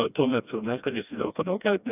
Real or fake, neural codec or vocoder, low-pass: fake; codec, 16 kHz, 1 kbps, FreqCodec, smaller model; 3.6 kHz